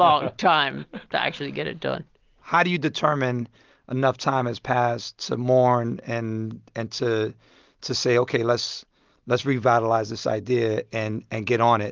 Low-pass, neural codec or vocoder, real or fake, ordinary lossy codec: 7.2 kHz; none; real; Opus, 24 kbps